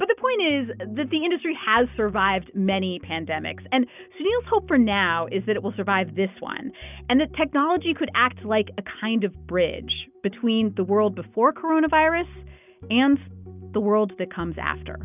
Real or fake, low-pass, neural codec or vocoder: real; 3.6 kHz; none